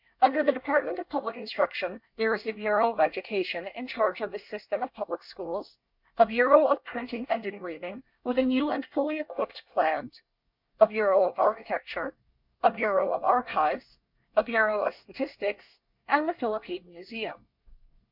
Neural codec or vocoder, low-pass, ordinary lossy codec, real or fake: codec, 24 kHz, 1 kbps, SNAC; 5.4 kHz; MP3, 48 kbps; fake